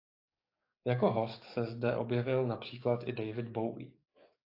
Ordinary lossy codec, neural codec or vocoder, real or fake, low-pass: AAC, 32 kbps; codec, 44.1 kHz, 7.8 kbps, DAC; fake; 5.4 kHz